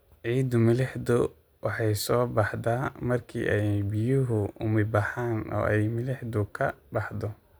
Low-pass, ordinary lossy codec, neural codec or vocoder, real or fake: none; none; none; real